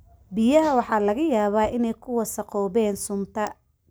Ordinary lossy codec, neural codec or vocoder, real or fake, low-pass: none; none; real; none